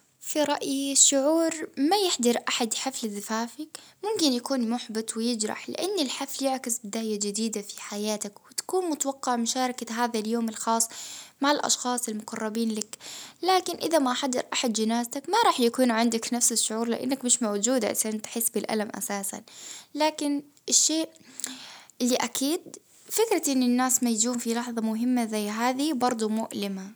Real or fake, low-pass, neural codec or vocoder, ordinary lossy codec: real; none; none; none